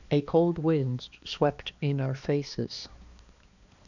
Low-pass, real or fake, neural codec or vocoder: 7.2 kHz; fake; codec, 16 kHz, 2 kbps, X-Codec, HuBERT features, trained on balanced general audio